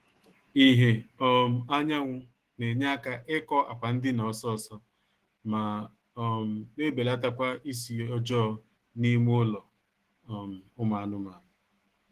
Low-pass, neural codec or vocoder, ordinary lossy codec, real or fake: 14.4 kHz; autoencoder, 48 kHz, 128 numbers a frame, DAC-VAE, trained on Japanese speech; Opus, 16 kbps; fake